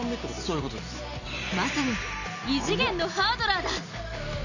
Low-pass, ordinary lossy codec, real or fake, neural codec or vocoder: 7.2 kHz; none; real; none